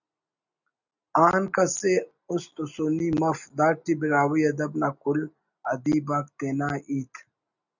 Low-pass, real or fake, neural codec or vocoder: 7.2 kHz; real; none